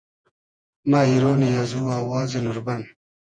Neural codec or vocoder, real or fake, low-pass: vocoder, 48 kHz, 128 mel bands, Vocos; fake; 9.9 kHz